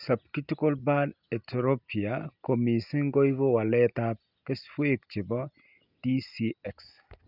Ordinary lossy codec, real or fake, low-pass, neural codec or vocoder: none; real; 5.4 kHz; none